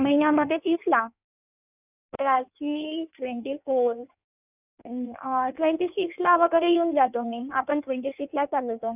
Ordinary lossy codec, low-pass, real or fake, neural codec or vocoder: none; 3.6 kHz; fake; codec, 16 kHz in and 24 kHz out, 1.1 kbps, FireRedTTS-2 codec